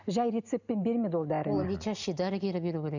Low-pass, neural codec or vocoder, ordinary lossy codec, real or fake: 7.2 kHz; none; none; real